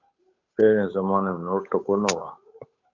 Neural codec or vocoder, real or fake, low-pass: codec, 16 kHz, 8 kbps, FunCodec, trained on Chinese and English, 25 frames a second; fake; 7.2 kHz